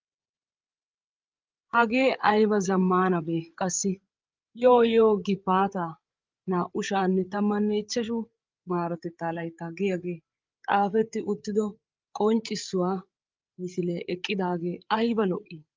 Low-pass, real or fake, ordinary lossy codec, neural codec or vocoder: 7.2 kHz; fake; Opus, 24 kbps; codec, 16 kHz, 8 kbps, FreqCodec, larger model